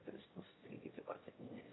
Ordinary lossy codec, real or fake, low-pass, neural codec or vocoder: AAC, 16 kbps; fake; 7.2 kHz; autoencoder, 22.05 kHz, a latent of 192 numbers a frame, VITS, trained on one speaker